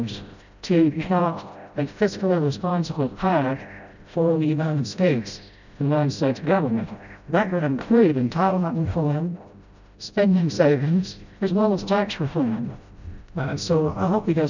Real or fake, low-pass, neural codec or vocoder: fake; 7.2 kHz; codec, 16 kHz, 0.5 kbps, FreqCodec, smaller model